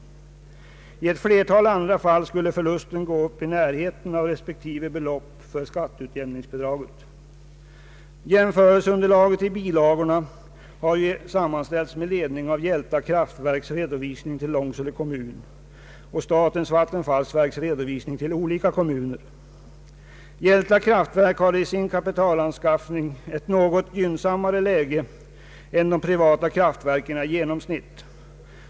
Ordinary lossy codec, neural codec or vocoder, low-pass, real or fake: none; none; none; real